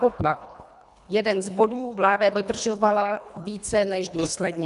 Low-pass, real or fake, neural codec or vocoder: 10.8 kHz; fake; codec, 24 kHz, 1.5 kbps, HILCodec